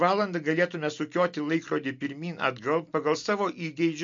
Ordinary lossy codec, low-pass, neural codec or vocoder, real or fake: MP3, 48 kbps; 7.2 kHz; none; real